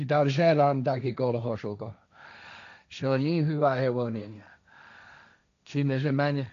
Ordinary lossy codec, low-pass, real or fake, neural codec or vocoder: AAC, 96 kbps; 7.2 kHz; fake; codec, 16 kHz, 1.1 kbps, Voila-Tokenizer